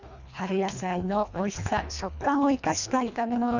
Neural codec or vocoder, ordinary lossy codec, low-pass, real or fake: codec, 24 kHz, 1.5 kbps, HILCodec; none; 7.2 kHz; fake